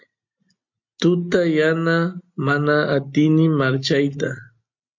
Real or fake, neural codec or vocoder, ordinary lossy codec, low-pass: real; none; MP3, 48 kbps; 7.2 kHz